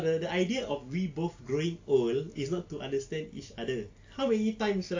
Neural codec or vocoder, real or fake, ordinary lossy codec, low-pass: none; real; AAC, 48 kbps; 7.2 kHz